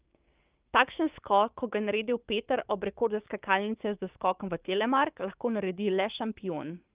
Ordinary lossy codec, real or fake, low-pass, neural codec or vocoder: Opus, 32 kbps; fake; 3.6 kHz; codec, 16 kHz, 6 kbps, DAC